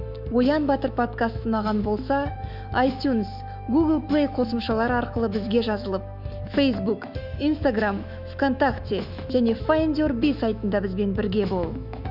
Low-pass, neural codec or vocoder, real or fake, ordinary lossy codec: 5.4 kHz; autoencoder, 48 kHz, 128 numbers a frame, DAC-VAE, trained on Japanese speech; fake; none